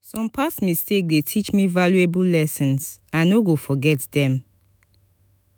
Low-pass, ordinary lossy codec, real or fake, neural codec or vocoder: none; none; fake; autoencoder, 48 kHz, 128 numbers a frame, DAC-VAE, trained on Japanese speech